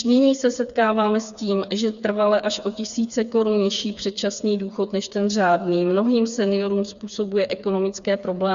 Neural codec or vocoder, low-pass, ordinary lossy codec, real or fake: codec, 16 kHz, 4 kbps, FreqCodec, smaller model; 7.2 kHz; Opus, 64 kbps; fake